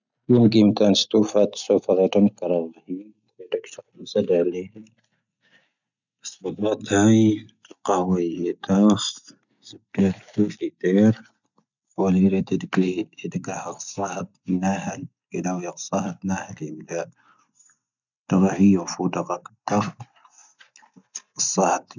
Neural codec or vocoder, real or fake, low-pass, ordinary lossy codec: none; real; 7.2 kHz; none